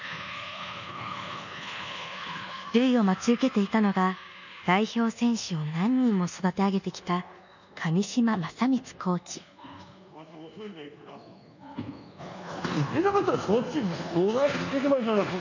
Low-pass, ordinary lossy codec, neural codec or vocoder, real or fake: 7.2 kHz; none; codec, 24 kHz, 1.2 kbps, DualCodec; fake